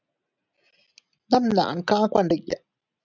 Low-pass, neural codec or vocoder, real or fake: 7.2 kHz; none; real